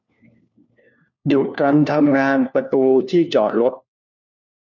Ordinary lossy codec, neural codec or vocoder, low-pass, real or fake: none; codec, 16 kHz, 1 kbps, FunCodec, trained on LibriTTS, 50 frames a second; 7.2 kHz; fake